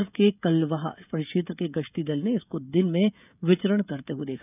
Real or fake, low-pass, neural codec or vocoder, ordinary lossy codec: fake; 3.6 kHz; codec, 16 kHz, 16 kbps, FreqCodec, smaller model; none